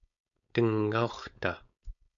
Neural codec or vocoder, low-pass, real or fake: codec, 16 kHz, 4.8 kbps, FACodec; 7.2 kHz; fake